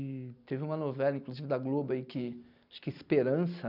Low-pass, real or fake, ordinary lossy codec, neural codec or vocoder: 5.4 kHz; real; none; none